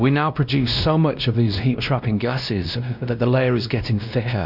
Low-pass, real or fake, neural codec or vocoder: 5.4 kHz; fake; codec, 16 kHz, 1 kbps, X-Codec, WavLM features, trained on Multilingual LibriSpeech